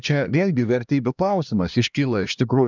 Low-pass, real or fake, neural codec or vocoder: 7.2 kHz; fake; codec, 16 kHz, 1 kbps, X-Codec, HuBERT features, trained on LibriSpeech